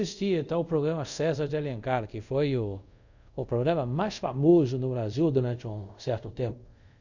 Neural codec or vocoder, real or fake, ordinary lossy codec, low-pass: codec, 24 kHz, 0.5 kbps, DualCodec; fake; none; 7.2 kHz